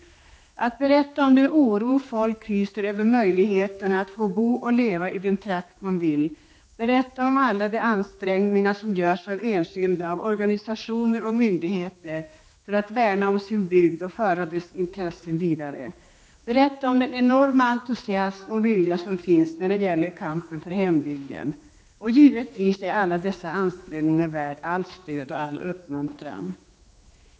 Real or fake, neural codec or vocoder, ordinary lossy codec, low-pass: fake; codec, 16 kHz, 2 kbps, X-Codec, HuBERT features, trained on general audio; none; none